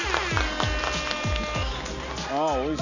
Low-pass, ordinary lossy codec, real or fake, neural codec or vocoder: 7.2 kHz; none; real; none